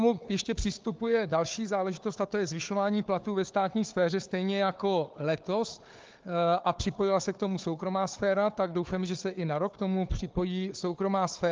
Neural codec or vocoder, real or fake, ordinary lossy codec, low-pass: codec, 16 kHz, 4 kbps, FunCodec, trained on Chinese and English, 50 frames a second; fake; Opus, 24 kbps; 7.2 kHz